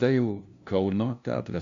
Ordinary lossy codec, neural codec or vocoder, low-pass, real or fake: MP3, 64 kbps; codec, 16 kHz, 0.5 kbps, FunCodec, trained on LibriTTS, 25 frames a second; 7.2 kHz; fake